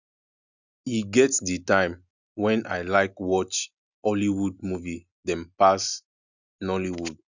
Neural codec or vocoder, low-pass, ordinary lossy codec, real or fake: none; 7.2 kHz; none; real